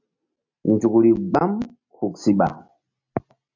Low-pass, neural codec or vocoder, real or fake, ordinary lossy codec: 7.2 kHz; none; real; MP3, 48 kbps